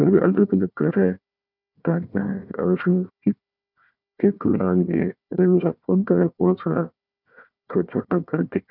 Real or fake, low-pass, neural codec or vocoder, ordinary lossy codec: fake; 5.4 kHz; codec, 16 kHz, 1 kbps, FunCodec, trained on Chinese and English, 50 frames a second; none